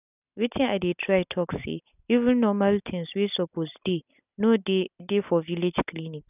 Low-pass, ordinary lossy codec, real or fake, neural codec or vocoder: 3.6 kHz; none; real; none